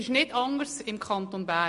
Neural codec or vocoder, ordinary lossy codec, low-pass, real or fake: none; MP3, 48 kbps; 14.4 kHz; real